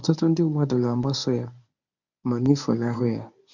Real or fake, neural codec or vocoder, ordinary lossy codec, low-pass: fake; codec, 24 kHz, 0.9 kbps, WavTokenizer, medium speech release version 1; none; 7.2 kHz